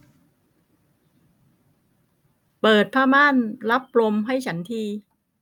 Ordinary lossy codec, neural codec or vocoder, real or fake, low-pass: none; none; real; 19.8 kHz